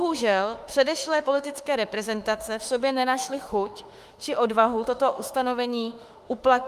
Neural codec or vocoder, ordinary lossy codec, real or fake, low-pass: autoencoder, 48 kHz, 32 numbers a frame, DAC-VAE, trained on Japanese speech; Opus, 32 kbps; fake; 14.4 kHz